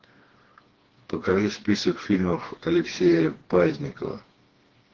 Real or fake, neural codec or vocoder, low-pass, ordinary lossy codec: fake; codec, 16 kHz, 2 kbps, FreqCodec, smaller model; 7.2 kHz; Opus, 16 kbps